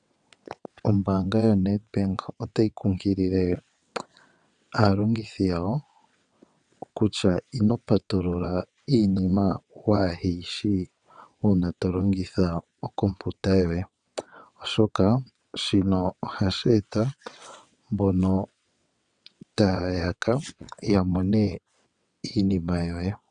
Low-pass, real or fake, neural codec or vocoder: 9.9 kHz; fake; vocoder, 22.05 kHz, 80 mel bands, WaveNeXt